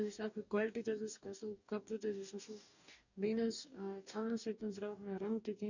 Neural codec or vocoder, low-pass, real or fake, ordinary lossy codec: codec, 44.1 kHz, 2.6 kbps, DAC; 7.2 kHz; fake; none